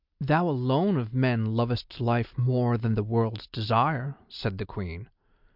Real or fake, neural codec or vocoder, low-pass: real; none; 5.4 kHz